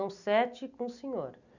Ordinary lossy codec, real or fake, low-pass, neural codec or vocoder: none; real; 7.2 kHz; none